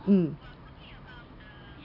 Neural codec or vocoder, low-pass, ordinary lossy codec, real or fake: none; 5.4 kHz; none; real